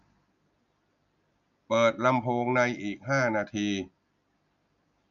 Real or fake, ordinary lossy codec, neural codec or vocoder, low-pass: real; none; none; 7.2 kHz